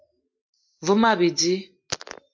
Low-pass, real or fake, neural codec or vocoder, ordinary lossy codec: 7.2 kHz; real; none; MP3, 48 kbps